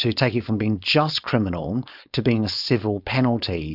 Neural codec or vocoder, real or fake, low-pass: codec, 16 kHz, 4.8 kbps, FACodec; fake; 5.4 kHz